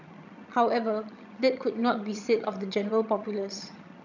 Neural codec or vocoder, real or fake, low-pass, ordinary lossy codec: vocoder, 22.05 kHz, 80 mel bands, HiFi-GAN; fake; 7.2 kHz; none